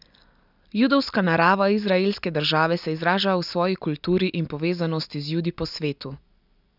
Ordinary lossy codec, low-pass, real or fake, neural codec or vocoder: none; 5.4 kHz; real; none